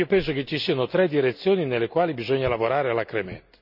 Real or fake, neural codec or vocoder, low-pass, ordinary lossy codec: real; none; 5.4 kHz; none